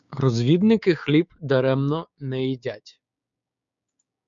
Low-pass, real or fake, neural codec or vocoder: 7.2 kHz; fake; codec, 16 kHz, 6 kbps, DAC